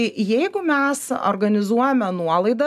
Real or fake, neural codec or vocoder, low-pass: real; none; 14.4 kHz